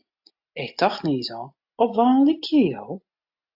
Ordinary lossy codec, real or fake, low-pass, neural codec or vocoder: AAC, 48 kbps; real; 5.4 kHz; none